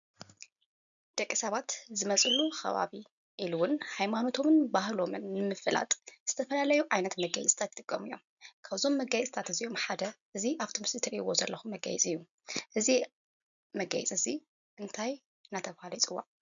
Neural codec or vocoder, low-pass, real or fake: none; 7.2 kHz; real